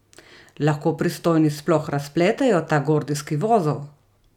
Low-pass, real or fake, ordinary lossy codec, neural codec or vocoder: 19.8 kHz; real; none; none